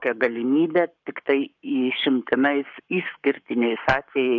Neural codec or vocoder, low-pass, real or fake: codec, 44.1 kHz, 7.8 kbps, Pupu-Codec; 7.2 kHz; fake